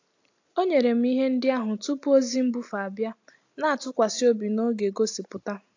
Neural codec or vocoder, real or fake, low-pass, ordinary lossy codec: none; real; 7.2 kHz; AAC, 48 kbps